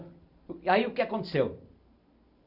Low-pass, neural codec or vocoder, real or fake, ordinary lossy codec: 5.4 kHz; none; real; none